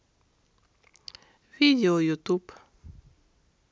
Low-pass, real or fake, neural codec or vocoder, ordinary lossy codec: none; real; none; none